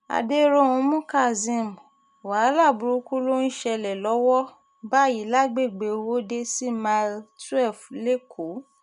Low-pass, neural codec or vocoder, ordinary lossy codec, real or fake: 10.8 kHz; none; none; real